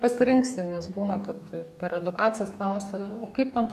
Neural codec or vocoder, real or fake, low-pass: codec, 44.1 kHz, 2.6 kbps, DAC; fake; 14.4 kHz